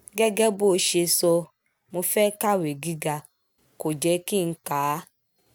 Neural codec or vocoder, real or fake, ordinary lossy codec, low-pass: none; real; none; none